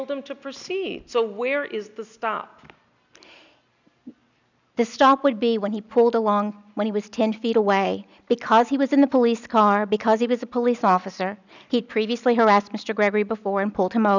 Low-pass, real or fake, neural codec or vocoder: 7.2 kHz; real; none